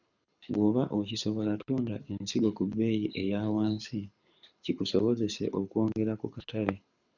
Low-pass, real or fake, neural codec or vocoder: 7.2 kHz; fake; codec, 24 kHz, 6 kbps, HILCodec